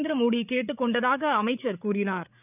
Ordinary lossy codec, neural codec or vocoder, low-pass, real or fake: none; codec, 16 kHz, 8 kbps, FreqCodec, larger model; 3.6 kHz; fake